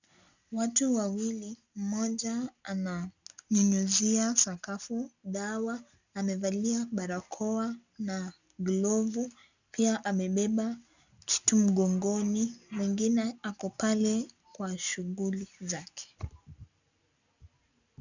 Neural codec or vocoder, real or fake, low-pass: none; real; 7.2 kHz